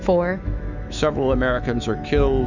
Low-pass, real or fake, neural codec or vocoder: 7.2 kHz; fake; autoencoder, 48 kHz, 128 numbers a frame, DAC-VAE, trained on Japanese speech